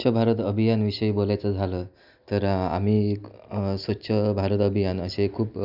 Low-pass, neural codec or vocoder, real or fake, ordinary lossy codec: 5.4 kHz; none; real; none